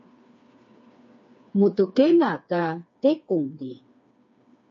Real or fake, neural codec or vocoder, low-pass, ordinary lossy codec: fake; codec, 16 kHz, 4 kbps, FreqCodec, smaller model; 7.2 kHz; MP3, 48 kbps